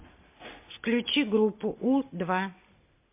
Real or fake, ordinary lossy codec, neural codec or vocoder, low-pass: real; MP3, 24 kbps; none; 3.6 kHz